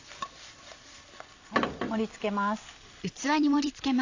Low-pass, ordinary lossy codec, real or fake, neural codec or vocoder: 7.2 kHz; AAC, 48 kbps; fake; vocoder, 44.1 kHz, 128 mel bands every 256 samples, BigVGAN v2